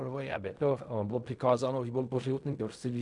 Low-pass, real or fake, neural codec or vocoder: 10.8 kHz; fake; codec, 16 kHz in and 24 kHz out, 0.4 kbps, LongCat-Audio-Codec, fine tuned four codebook decoder